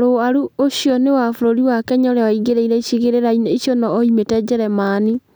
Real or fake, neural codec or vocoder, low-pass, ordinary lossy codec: real; none; none; none